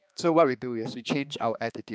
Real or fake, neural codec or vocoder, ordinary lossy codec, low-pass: fake; codec, 16 kHz, 2 kbps, X-Codec, HuBERT features, trained on balanced general audio; none; none